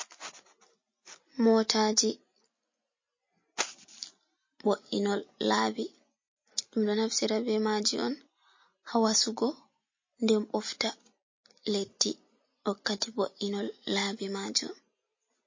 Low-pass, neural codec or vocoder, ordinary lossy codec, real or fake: 7.2 kHz; none; MP3, 32 kbps; real